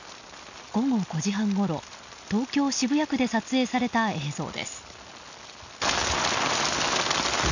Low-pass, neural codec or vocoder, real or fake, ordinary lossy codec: 7.2 kHz; none; real; none